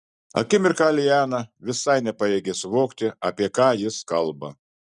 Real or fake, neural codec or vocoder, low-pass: real; none; 10.8 kHz